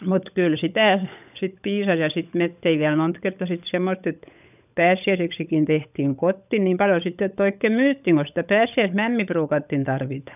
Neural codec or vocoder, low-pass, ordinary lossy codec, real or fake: codec, 16 kHz, 16 kbps, FunCodec, trained on LibriTTS, 50 frames a second; 3.6 kHz; none; fake